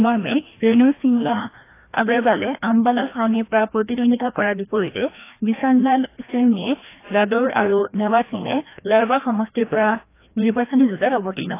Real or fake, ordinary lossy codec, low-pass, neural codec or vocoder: fake; AAC, 24 kbps; 3.6 kHz; codec, 16 kHz, 1 kbps, FreqCodec, larger model